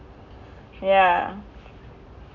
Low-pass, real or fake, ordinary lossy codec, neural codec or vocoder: 7.2 kHz; real; none; none